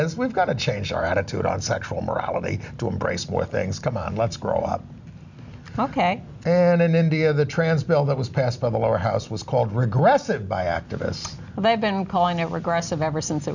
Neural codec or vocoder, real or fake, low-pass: none; real; 7.2 kHz